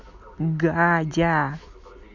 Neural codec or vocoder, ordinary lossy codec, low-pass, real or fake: none; none; 7.2 kHz; real